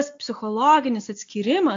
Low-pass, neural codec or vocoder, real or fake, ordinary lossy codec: 7.2 kHz; none; real; AAC, 48 kbps